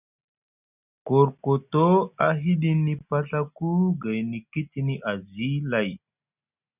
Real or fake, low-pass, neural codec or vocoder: real; 3.6 kHz; none